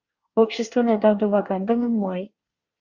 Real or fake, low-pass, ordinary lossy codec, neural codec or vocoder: fake; 7.2 kHz; Opus, 64 kbps; codec, 24 kHz, 1 kbps, SNAC